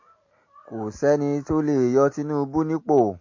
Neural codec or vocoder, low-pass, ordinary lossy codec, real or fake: none; 7.2 kHz; MP3, 32 kbps; real